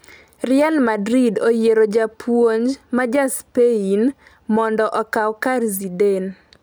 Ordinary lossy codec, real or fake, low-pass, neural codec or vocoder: none; real; none; none